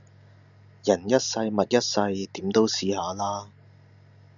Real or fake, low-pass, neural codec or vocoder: real; 7.2 kHz; none